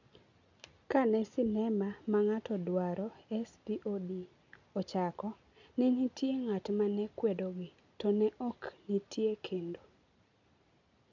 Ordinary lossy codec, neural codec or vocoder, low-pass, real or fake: none; none; 7.2 kHz; real